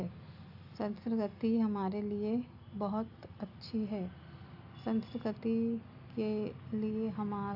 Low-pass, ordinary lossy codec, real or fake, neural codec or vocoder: 5.4 kHz; AAC, 48 kbps; real; none